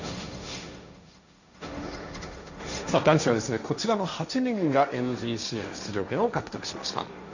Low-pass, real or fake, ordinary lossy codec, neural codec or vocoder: 7.2 kHz; fake; none; codec, 16 kHz, 1.1 kbps, Voila-Tokenizer